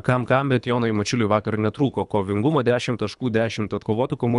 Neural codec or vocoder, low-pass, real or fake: codec, 24 kHz, 3 kbps, HILCodec; 10.8 kHz; fake